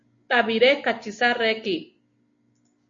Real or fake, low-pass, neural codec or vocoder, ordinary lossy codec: real; 7.2 kHz; none; MP3, 48 kbps